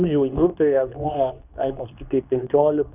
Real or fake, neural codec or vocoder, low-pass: fake; codec, 24 kHz, 0.9 kbps, WavTokenizer, medium speech release version 2; 3.6 kHz